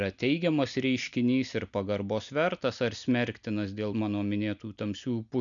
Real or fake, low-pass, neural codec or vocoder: real; 7.2 kHz; none